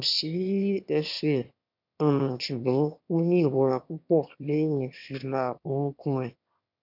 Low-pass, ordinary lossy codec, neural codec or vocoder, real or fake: 5.4 kHz; none; autoencoder, 22.05 kHz, a latent of 192 numbers a frame, VITS, trained on one speaker; fake